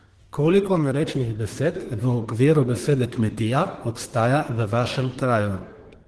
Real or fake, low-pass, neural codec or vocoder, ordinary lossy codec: fake; 10.8 kHz; codec, 24 kHz, 1 kbps, SNAC; Opus, 16 kbps